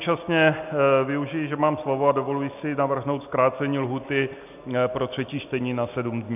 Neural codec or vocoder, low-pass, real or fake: none; 3.6 kHz; real